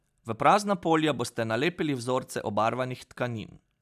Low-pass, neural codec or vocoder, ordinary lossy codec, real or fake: 14.4 kHz; none; none; real